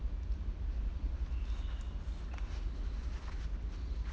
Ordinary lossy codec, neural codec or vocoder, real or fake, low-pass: none; none; real; none